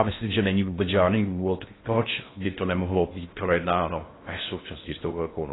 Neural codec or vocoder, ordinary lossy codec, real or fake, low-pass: codec, 16 kHz in and 24 kHz out, 0.6 kbps, FocalCodec, streaming, 4096 codes; AAC, 16 kbps; fake; 7.2 kHz